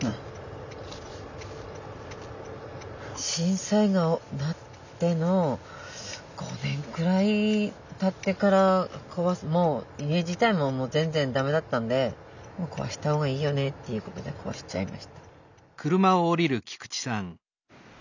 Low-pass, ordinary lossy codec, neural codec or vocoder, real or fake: 7.2 kHz; none; none; real